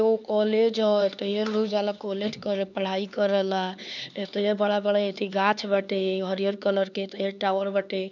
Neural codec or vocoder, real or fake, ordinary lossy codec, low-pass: codec, 16 kHz, 4 kbps, X-Codec, HuBERT features, trained on LibriSpeech; fake; none; 7.2 kHz